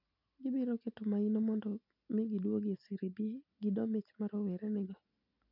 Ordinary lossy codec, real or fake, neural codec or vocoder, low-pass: none; real; none; 5.4 kHz